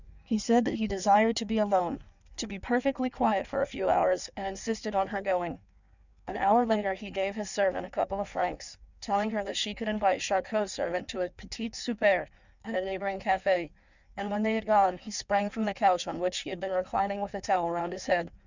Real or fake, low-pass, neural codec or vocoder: fake; 7.2 kHz; codec, 16 kHz in and 24 kHz out, 1.1 kbps, FireRedTTS-2 codec